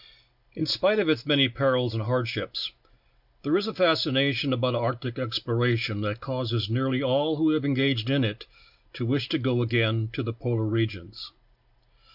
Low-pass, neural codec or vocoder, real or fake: 5.4 kHz; none; real